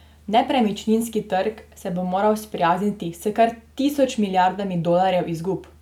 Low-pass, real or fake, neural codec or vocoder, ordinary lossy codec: 19.8 kHz; real; none; none